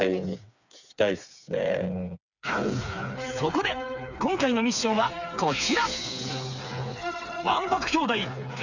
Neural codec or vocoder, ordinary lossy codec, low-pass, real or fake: codec, 16 kHz, 4 kbps, FreqCodec, smaller model; none; 7.2 kHz; fake